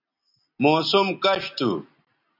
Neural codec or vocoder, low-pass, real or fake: none; 5.4 kHz; real